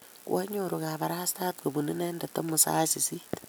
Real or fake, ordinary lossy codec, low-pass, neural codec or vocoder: real; none; none; none